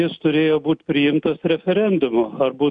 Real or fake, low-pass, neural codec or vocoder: real; 9.9 kHz; none